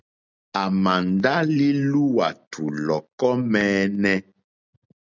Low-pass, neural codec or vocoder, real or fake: 7.2 kHz; none; real